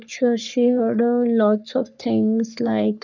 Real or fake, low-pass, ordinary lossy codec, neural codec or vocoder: fake; 7.2 kHz; none; codec, 44.1 kHz, 3.4 kbps, Pupu-Codec